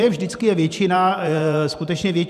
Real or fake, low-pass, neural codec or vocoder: fake; 14.4 kHz; vocoder, 44.1 kHz, 128 mel bands every 512 samples, BigVGAN v2